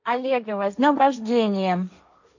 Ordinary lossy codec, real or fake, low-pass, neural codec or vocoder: none; fake; 7.2 kHz; codec, 16 kHz, 1.1 kbps, Voila-Tokenizer